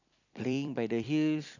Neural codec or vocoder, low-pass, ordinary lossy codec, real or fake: vocoder, 44.1 kHz, 128 mel bands every 256 samples, BigVGAN v2; 7.2 kHz; none; fake